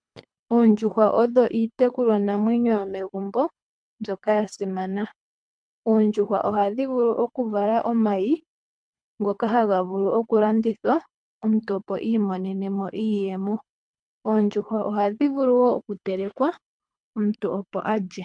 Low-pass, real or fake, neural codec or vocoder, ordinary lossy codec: 9.9 kHz; fake; codec, 24 kHz, 3 kbps, HILCodec; MP3, 64 kbps